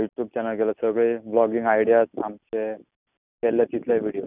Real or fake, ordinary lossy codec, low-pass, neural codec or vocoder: real; none; 3.6 kHz; none